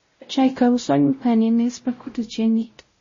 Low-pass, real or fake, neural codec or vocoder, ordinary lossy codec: 7.2 kHz; fake; codec, 16 kHz, 0.5 kbps, X-Codec, WavLM features, trained on Multilingual LibriSpeech; MP3, 32 kbps